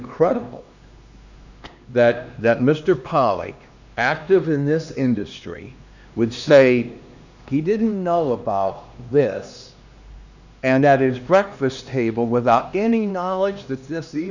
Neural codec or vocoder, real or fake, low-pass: codec, 16 kHz, 2 kbps, X-Codec, HuBERT features, trained on LibriSpeech; fake; 7.2 kHz